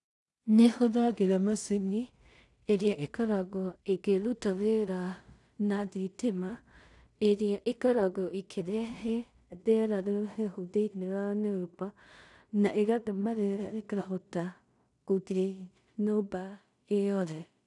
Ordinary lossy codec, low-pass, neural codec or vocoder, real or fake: none; 10.8 kHz; codec, 16 kHz in and 24 kHz out, 0.4 kbps, LongCat-Audio-Codec, two codebook decoder; fake